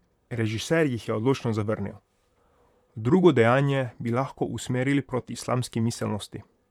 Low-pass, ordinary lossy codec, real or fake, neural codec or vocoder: 19.8 kHz; none; fake; vocoder, 44.1 kHz, 128 mel bands, Pupu-Vocoder